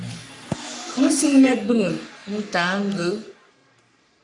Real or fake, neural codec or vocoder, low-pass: fake; codec, 44.1 kHz, 3.4 kbps, Pupu-Codec; 10.8 kHz